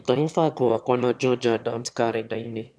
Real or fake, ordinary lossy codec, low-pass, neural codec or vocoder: fake; none; none; autoencoder, 22.05 kHz, a latent of 192 numbers a frame, VITS, trained on one speaker